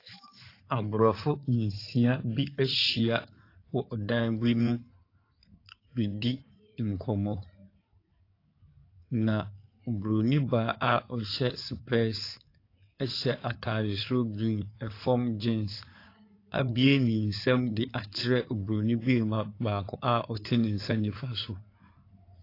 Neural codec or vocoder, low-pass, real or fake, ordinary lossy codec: codec, 16 kHz in and 24 kHz out, 2.2 kbps, FireRedTTS-2 codec; 5.4 kHz; fake; AAC, 32 kbps